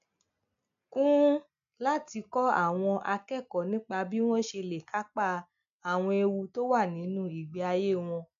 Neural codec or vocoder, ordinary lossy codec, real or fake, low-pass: none; MP3, 96 kbps; real; 7.2 kHz